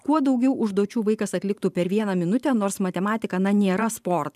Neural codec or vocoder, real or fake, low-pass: vocoder, 44.1 kHz, 128 mel bands every 512 samples, BigVGAN v2; fake; 14.4 kHz